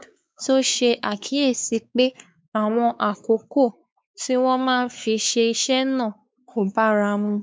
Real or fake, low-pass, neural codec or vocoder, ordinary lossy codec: fake; none; codec, 16 kHz, 4 kbps, X-Codec, WavLM features, trained on Multilingual LibriSpeech; none